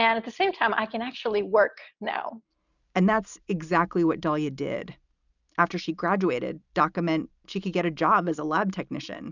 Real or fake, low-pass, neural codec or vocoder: real; 7.2 kHz; none